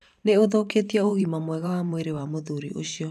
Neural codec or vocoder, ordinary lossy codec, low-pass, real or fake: vocoder, 44.1 kHz, 128 mel bands every 512 samples, BigVGAN v2; none; 14.4 kHz; fake